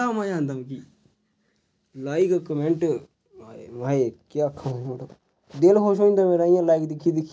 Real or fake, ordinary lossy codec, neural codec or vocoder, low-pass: real; none; none; none